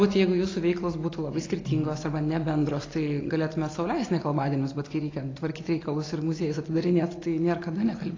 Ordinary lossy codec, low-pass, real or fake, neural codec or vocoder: AAC, 32 kbps; 7.2 kHz; real; none